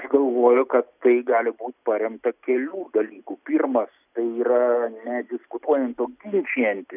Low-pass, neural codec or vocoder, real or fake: 3.6 kHz; none; real